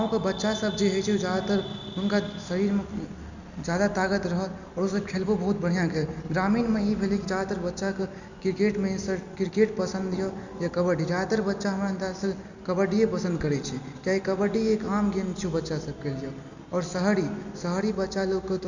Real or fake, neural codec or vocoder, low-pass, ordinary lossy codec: real; none; 7.2 kHz; none